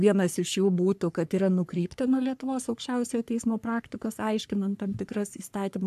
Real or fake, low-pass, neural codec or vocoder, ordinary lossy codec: fake; 14.4 kHz; codec, 44.1 kHz, 3.4 kbps, Pupu-Codec; AAC, 96 kbps